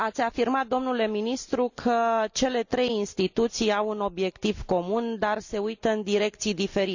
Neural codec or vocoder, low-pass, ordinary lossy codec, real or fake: none; 7.2 kHz; none; real